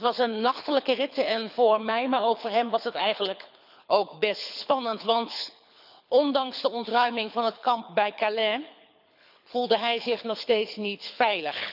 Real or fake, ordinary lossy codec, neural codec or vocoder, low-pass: fake; none; codec, 24 kHz, 6 kbps, HILCodec; 5.4 kHz